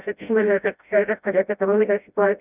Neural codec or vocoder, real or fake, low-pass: codec, 16 kHz, 0.5 kbps, FreqCodec, smaller model; fake; 3.6 kHz